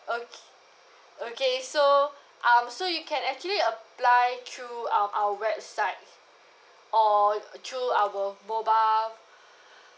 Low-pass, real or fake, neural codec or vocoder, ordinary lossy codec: none; real; none; none